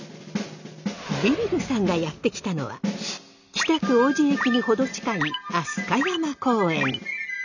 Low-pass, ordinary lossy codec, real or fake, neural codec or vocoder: 7.2 kHz; none; real; none